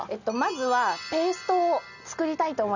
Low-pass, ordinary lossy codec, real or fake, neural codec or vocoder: 7.2 kHz; none; real; none